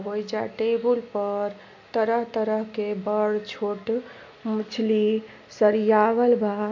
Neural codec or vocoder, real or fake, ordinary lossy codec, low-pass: none; real; MP3, 48 kbps; 7.2 kHz